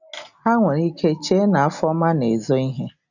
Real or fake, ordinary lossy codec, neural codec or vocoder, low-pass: real; none; none; 7.2 kHz